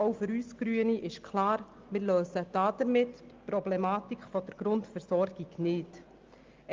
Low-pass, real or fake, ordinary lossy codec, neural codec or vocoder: 7.2 kHz; real; Opus, 24 kbps; none